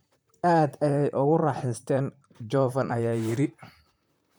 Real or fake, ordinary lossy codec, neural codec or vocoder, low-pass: fake; none; vocoder, 44.1 kHz, 128 mel bands, Pupu-Vocoder; none